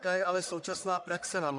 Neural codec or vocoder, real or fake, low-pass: codec, 44.1 kHz, 1.7 kbps, Pupu-Codec; fake; 10.8 kHz